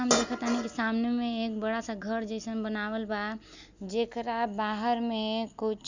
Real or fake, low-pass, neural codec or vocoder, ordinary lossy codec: real; 7.2 kHz; none; Opus, 64 kbps